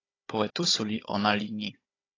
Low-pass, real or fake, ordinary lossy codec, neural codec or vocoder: 7.2 kHz; fake; AAC, 32 kbps; codec, 16 kHz, 16 kbps, FunCodec, trained on Chinese and English, 50 frames a second